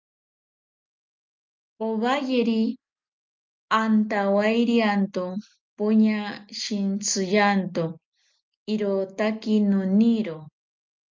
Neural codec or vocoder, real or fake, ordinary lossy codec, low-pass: none; real; Opus, 24 kbps; 7.2 kHz